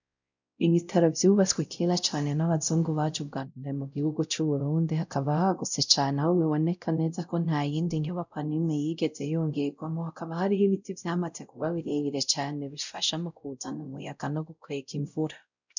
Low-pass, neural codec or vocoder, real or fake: 7.2 kHz; codec, 16 kHz, 0.5 kbps, X-Codec, WavLM features, trained on Multilingual LibriSpeech; fake